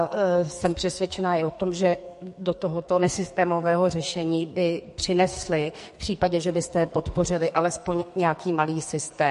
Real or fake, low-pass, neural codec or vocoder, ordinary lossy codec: fake; 14.4 kHz; codec, 44.1 kHz, 2.6 kbps, SNAC; MP3, 48 kbps